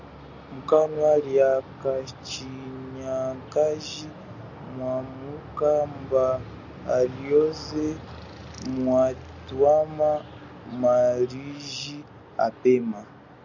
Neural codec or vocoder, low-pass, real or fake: none; 7.2 kHz; real